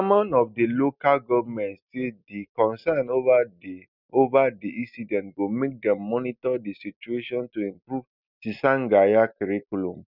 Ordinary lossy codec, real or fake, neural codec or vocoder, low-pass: none; real; none; 5.4 kHz